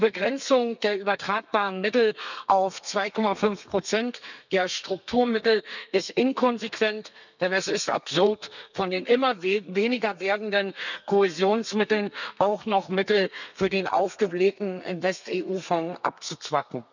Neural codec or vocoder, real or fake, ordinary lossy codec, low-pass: codec, 32 kHz, 1.9 kbps, SNAC; fake; none; 7.2 kHz